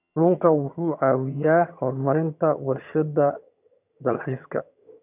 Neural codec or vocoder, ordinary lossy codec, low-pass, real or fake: vocoder, 22.05 kHz, 80 mel bands, HiFi-GAN; none; 3.6 kHz; fake